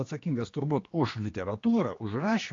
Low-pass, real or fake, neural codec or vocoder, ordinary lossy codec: 7.2 kHz; fake; codec, 16 kHz, 2 kbps, X-Codec, HuBERT features, trained on general audio; AAC, 32 kbps